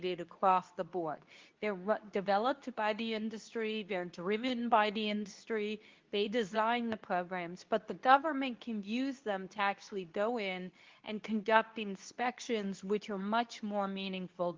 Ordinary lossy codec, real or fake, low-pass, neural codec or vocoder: Opus, 32 kbps; fake; 7.2 kHz; codec, 24 kHz, 0.9 kbps, WavTokenizer, medium speech release version 2